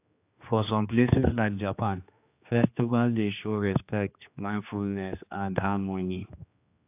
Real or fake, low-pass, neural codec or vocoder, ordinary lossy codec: fake; 3.6 kHz; codec, 16 kHz, 2 kbps, X-Codec, HuBERT features, trained on general audio; AAC, 32 kbps